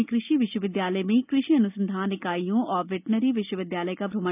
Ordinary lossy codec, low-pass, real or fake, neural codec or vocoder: none; 3.6 kHz; real; none